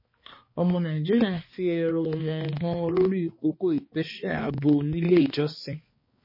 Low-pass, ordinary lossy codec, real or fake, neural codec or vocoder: 5.4 kHz; MP3, 24 kbps; fake; codec, 16 kHz, 2 kbps, X-Codec, HuBERT features, trained on balanced general audio